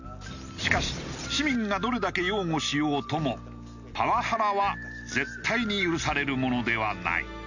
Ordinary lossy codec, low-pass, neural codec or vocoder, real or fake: none; 7.2 kHz; none; real